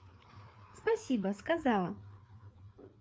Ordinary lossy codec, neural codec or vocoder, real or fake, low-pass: none; codec, 16 kHz, 4 kbps, FreqCodec, larger model; fake; none